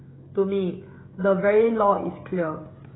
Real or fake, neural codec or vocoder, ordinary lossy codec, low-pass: fake; codec, 16 kHz, 16 kbps, FreqCodec, smaller model; AAC, 16 kbps; 7.2 kHz